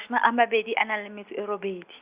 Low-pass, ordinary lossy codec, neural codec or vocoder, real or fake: 3.6 kHz; Opus, 64 kbps; none; real